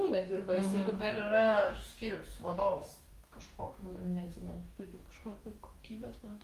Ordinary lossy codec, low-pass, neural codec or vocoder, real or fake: Opus, 32 kbps; 14.4 kHz; codec, 44.1 kHz, 2.6 kbps, DAC; fake